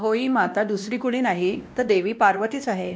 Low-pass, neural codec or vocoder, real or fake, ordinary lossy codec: none; codec, 16 kHz, 1 kbps, X-Codec, WavLM features, trained on Multilingual LibriSpeech; fake; none